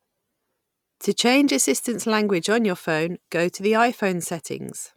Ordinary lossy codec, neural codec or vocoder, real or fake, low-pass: none; none; real; 19.8 kHz